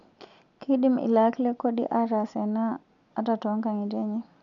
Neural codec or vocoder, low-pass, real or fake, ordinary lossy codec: none; 7.2 kHz; real; none